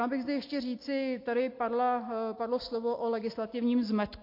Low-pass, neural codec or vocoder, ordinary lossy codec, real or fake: 5.4 kHz; none; MP3, 32 kbps; real